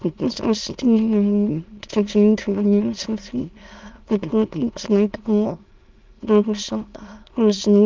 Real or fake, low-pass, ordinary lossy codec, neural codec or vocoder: fake; 7.2 kHz; Opus, 24 kbps; autoencoder, 22.05 kHz, a latent of 192 numbers a frame, VITS, trained on many speakers